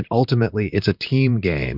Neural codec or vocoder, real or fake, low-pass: vocoder, 44.1 kHz, 128 mel bands, Pupu-Vocoder; fake; 5.4 kHz